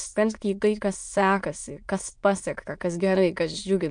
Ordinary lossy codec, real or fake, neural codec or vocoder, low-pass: MP3, 64 kbps; fake; autoencoder, 22.05 kHz, a latent of 192 numbers a frame, VITS, trained on many speakers; 9.9 kHz